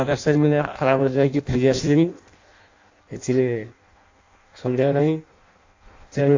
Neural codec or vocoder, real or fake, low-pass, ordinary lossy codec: codec, 16 kHz in and 24 kHz out, 0.6 kbps, FireRedTTS-2 codec; fake; 7.2 kHz; AAC, 48 kbps